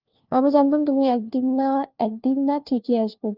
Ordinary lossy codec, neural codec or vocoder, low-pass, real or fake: Opus, 32 kbps; codec, 16 kHz, 1 kbps, FunCodec, trained on LibriTTS, 50 frames a second; 5.4 kHz; fake